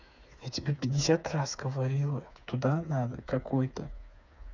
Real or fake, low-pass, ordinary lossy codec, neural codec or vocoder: fake; 7.2 kHz; none; codec, 16 kHz, 4 kbps, FreqCodec, smaller model